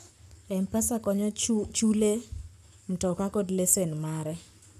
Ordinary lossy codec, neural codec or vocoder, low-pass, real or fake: none; codec, 44.1 kHz, 7.8 kbps, Pupu-Codec; 14.4 kHz; fake